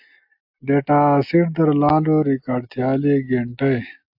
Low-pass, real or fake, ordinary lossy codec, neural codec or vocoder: 5.4 kHz; real; AAC, 48 kbps; none